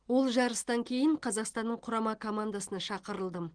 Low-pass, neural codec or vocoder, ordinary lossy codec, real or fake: 9.9 kHz; none; Opus, 16 kbps; real